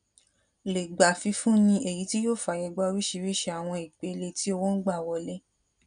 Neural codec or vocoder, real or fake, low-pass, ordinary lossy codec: none; real; 9.9 kHz; none